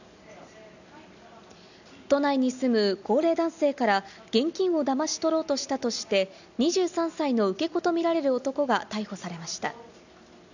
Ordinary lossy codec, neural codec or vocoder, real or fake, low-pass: none; none; real; 7.2 kHz